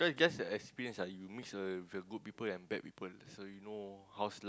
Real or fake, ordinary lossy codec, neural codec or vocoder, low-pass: real; none; none; none